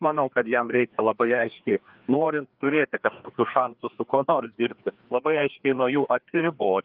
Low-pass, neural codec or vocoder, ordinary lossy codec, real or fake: 5.4 kHz; codec, 16 kHz, 2 kbps, FreqCodec, larger model; Opus, 32 kbps; fake